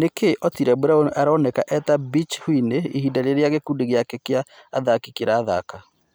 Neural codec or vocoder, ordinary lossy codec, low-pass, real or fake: none; none; none; real